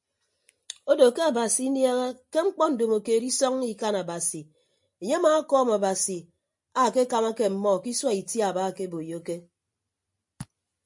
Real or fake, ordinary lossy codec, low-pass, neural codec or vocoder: real; MP3, 48 kbps; 10.8 kHz; none